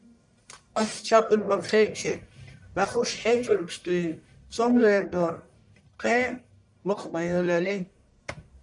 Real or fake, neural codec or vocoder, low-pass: fake; codec, 44.1 kHz, 1.7 kbps, Pupu-Codec; 10.8 kHz